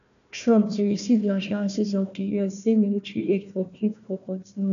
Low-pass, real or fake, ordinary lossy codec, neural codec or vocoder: 7.2 kHz; fake; none; codec, 16 kHz, 1 kbps, FunCodec, trained on Chinese and English, 50 frames a second